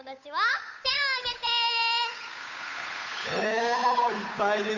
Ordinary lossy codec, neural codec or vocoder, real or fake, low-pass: none; codec, 16 kHz, 8 kbps, FunCodec, trained on Chinese and English, 25 frames a second; fake; 7.2 kHz